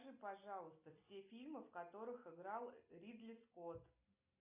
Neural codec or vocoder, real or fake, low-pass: none; real; 3.6 kHz